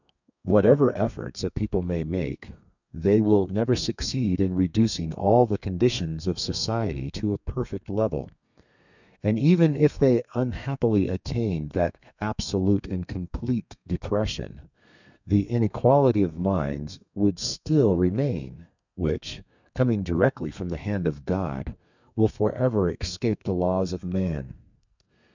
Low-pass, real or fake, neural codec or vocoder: 7.2 kHz; fake; codec, 32 kHz, 1.9 kbps, SNAC